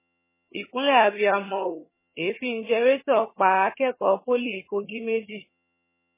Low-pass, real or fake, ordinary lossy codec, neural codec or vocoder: 3.6 kHz; fake; MP3, 16 kbps; vocoder, 22.05 kHz, 80 mel bands, HiFi-GAN